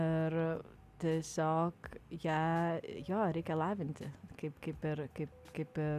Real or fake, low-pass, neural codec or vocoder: real; 14.4 kHz; none